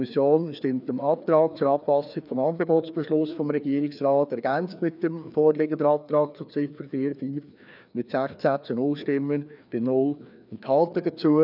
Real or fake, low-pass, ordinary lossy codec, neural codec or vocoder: fake; 5.4 kHz; none; codec, 16 kHz, 2 kbps, FreqCodec, larger model